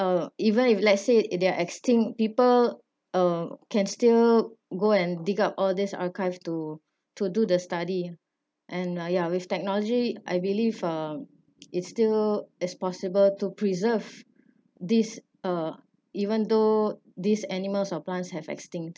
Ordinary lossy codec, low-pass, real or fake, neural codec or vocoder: none; none; real; none